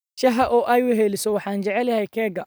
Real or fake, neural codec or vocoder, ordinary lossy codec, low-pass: real; none; none; none